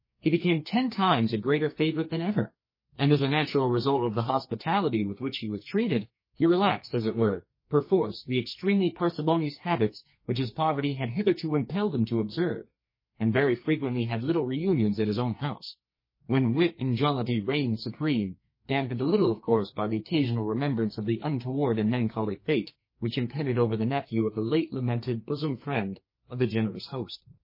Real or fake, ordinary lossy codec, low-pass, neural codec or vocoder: fake; MP3, 24 kbps; 5.4 kHz; codec, 44.1 kHz, 2.6 kbps, SNAC